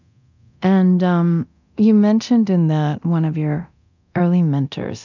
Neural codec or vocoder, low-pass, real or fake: codec, 24 kHz, 0.9 kbps, DualCodec; 7.2 kHz; fake